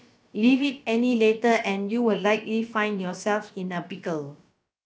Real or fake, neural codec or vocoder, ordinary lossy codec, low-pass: fake; codec, 16 kHz, about 1 kbps, DyCAST, with the encoder's durations; none; none